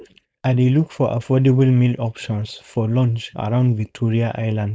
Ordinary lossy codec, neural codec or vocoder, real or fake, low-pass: none; codec, 16 kHz, 4.8 kbps, FACodec; fake; none